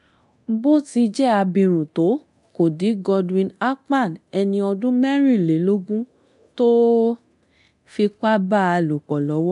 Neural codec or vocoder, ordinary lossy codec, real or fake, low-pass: codec, 24 kHz, 0.9 kbps, DualCodec; MP3, 96 kbps; fake; 10.8 kHz